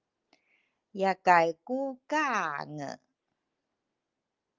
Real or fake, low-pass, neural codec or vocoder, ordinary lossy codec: real; 7.2 kHz; none; Opus, 32 kbps